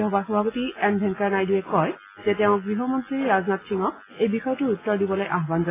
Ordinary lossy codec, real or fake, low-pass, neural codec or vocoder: AAC, 16 kbps; real; 3.6 kHz; none